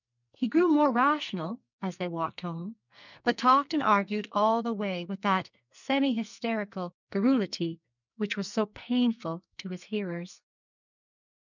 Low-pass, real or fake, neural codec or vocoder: 7.2 kHz; fake; codec, 44.1 kHz, 2.6 kbps, SNAC